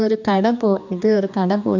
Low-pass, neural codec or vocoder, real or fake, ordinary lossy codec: 7.2 kHz; codec, 16 kHz, 2 kbps, X-Codec, HuBERT features, trained on general audio; fake; none